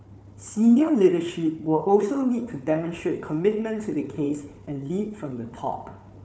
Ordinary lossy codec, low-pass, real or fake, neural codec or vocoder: none; none; fake; codec, 16 kHz, 4 kbps, FunCodec, trained on Chinese and English, 50 frames a second